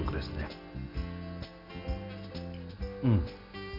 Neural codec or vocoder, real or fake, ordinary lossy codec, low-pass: none; real; none; 5.4 kHz